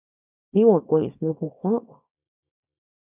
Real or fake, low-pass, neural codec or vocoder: fake; 3.6 kHz; codec, 24 kHz, 0.9 kbps, WavTokenizer, small release